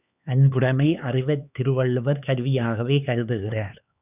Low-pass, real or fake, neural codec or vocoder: 3.6 kHz; fake; codec, 16 kHz, 4 kbps, X-Codec, HuBERT features, trained on LibriSpeech